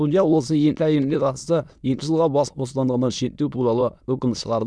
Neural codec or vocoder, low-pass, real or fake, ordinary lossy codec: autoencoder, 22.05 kHz, a latent of 192 numbers a frame, VITS, trained on many speakers; none; fake; none